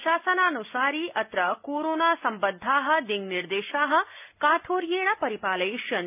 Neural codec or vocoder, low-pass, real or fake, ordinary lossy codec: none; 3.6 kHz; real; none